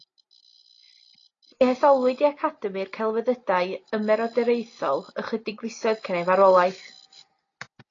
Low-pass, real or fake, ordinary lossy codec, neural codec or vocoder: 7.2 kHz; real; AAC, 32 kbps; none